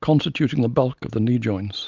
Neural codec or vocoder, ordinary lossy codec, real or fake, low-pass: none; Opus, 24 kbps; real; 7.2 kHz